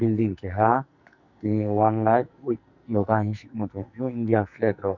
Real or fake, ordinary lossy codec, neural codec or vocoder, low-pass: fake; none; codec, 44.1 kHz, 2.6 kbps, SNAC; 7.2 kHz